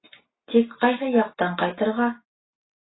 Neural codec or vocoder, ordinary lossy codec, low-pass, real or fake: none; AAC, 16 kbps; 7.2 kHz; real